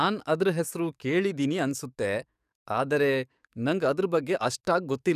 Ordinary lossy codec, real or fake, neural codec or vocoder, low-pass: none; fake; codec, 44.1 kHz, 7.8 kbps, DAC; 14.4 kHz